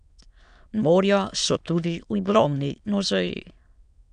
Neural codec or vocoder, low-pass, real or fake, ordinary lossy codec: autoencoder, 22.05 kHz, a latent of 192 numbers a frame, VITS, trained on many speakers; 9.9 kHz; fake; AAC, 96 kbps